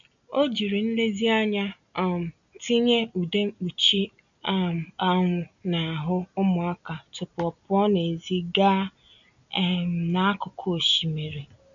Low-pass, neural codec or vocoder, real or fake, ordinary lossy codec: 7.2 kHz; none; real; none